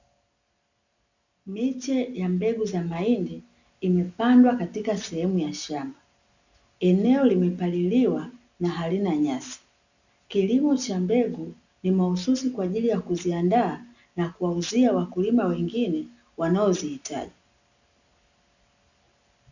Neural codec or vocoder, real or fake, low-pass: none; real; 7.2 kHz